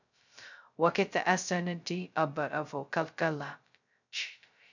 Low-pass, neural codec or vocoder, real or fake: 7.2 kHz; codec, 16 kHz, 0.2 kbps, FocalCodec; fake